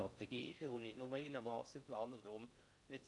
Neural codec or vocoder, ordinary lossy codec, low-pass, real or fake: codec, 16 kHz in and 24 kHz out, 0.6 kbps, FocalCodec, streaming, 4096 codes; none; 10.8 kHz; fake